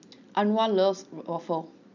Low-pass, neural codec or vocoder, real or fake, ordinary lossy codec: 7.2 kHz; none; real; none